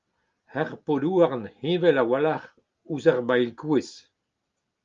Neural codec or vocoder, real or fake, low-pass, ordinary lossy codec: none; real; 7.2 kHz; Opus, 32 kbps